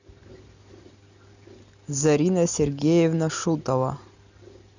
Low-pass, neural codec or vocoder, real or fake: 7.2 kHz; none; real